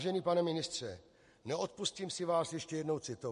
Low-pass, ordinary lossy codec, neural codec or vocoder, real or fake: 10.8 kHz; MP3, 48 kbps; none; real